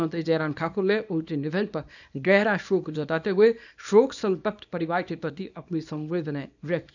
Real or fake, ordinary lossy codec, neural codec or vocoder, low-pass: fake; none; codec, 24 kHz, 0.9 kbps, WavTokenizer, small release; 7.2 kHz